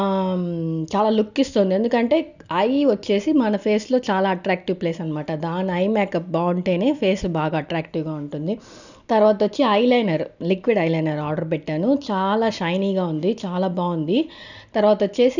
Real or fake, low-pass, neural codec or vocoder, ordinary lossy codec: real; 7.2 kHz; none; none